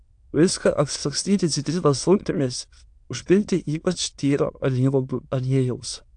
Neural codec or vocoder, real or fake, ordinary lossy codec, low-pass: autoencoder, 22.05 kHz, a latent of 192 numbers a frame, VITS, trained on many speakers; fake; AAC, 64 kbps; 9.9 kHz